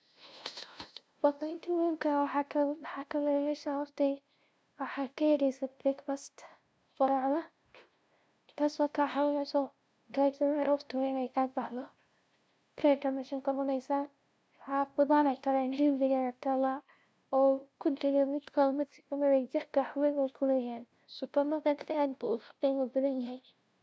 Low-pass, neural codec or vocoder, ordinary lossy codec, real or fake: none; codec, 16 kHz, 0.5 kbps, FunCodec, trained on LibriTTS, 25 frames a second; none; fake